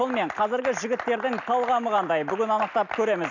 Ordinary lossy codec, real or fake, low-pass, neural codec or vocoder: none; real; 7.2 kHz; none